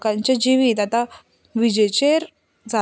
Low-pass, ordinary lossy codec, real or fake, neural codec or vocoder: none; none; real; none